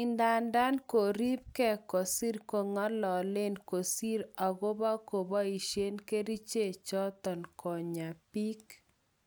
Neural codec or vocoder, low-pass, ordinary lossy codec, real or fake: none; none; none; real